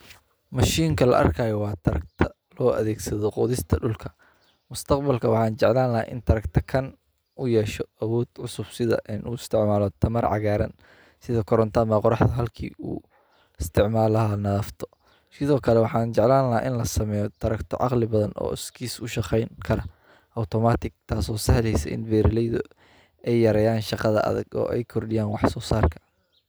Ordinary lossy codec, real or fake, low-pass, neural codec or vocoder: none; real; none; none